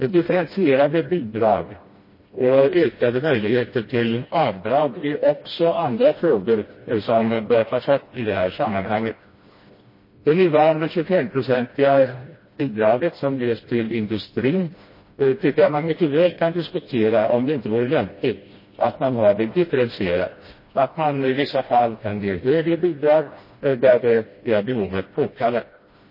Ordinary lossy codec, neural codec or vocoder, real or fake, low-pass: MP3, 24 kbps; codec, 16 kHz, 1 kbps, FreqCodec, smaller model; fake; 5.4 kHz